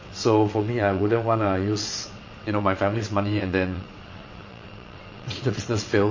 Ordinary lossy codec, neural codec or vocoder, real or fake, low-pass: MP3, 32 kbps; vocoder, 22.05 kHz, 80 mel bands, WaveNeXt; fake; 7.2 kHz